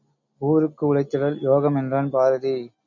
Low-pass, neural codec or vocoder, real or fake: 7.2 kHz; none; real